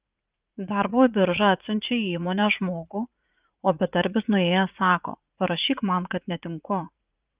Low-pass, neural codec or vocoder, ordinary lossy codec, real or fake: 3.6 kHz; vocoder, 44.1 kHz, 80 mel bands, Vocos; Opus, 24 kbps; fake